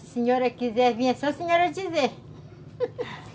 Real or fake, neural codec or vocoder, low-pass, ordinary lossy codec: real; none; none; none